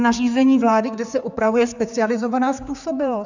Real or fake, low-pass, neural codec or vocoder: fake; 7.2 kHz; codec, 16 kHz, 4 kbps, X-Codec, HuBERT features, trained on general audio